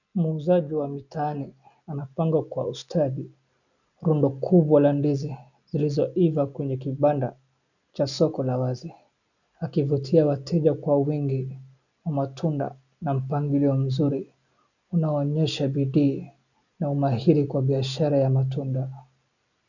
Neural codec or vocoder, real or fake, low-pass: none; real; 7.2 kHz